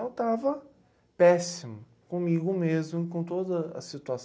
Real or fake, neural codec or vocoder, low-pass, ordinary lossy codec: real; none; none; none